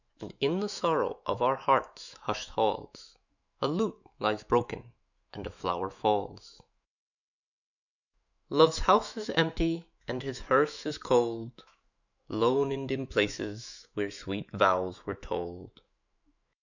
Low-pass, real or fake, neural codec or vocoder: 7.2 kHz; fake; autoencoder, 48 kHz, 128 numbers a frame, DAC-VAE, trained on Japanese speech